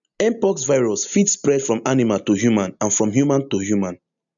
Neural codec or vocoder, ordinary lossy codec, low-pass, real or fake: none; none; 7.2 kHz; real